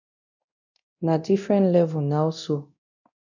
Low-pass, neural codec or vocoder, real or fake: 7.2 kHz; codec, 24 kHz, 0.9 kbps, DualCodec; fake